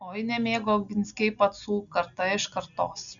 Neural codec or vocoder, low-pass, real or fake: none; 7.2 kHz; real